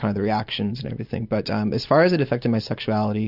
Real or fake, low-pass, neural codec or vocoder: real; 5.4 kHz; none